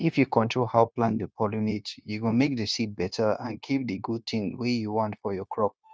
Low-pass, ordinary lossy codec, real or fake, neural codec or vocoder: none; none; fake; codec, 16 kHz, 0.9 kbps, LongCat-Audio-Codec